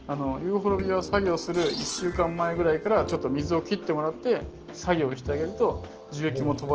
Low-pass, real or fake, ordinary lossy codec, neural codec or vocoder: 7.2 kHz; real; Opus, 16 kbps; none